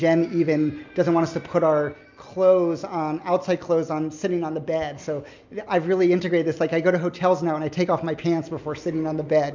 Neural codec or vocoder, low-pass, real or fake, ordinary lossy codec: none; 7.2 kHz; real; MP3, 64 kbps